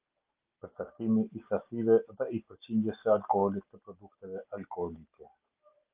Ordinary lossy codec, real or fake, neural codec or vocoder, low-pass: Opus, 32 kbps; real; none; 3.6 kHz